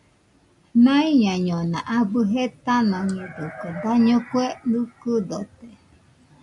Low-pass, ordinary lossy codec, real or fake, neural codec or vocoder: 10.8 kHz; MP3, 96 kbps; real; none